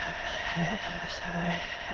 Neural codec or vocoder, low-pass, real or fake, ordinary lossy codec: autoencoder, 22.05 kHz, a latent of 192 numbers a frame, VITS, trained on many speakers; 7.2 kHz; fake; Opus, 16 kbps